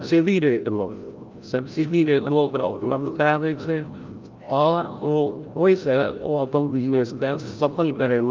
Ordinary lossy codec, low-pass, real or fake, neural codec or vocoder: Opus, 24 kbps; 7.2 kHz; fake; codec, 16 kHz, 0.5 kbps, FreqCodec, larger model